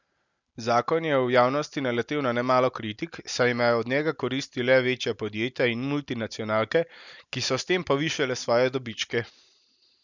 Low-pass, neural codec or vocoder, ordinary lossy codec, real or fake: 7.2 kHz; none; none; real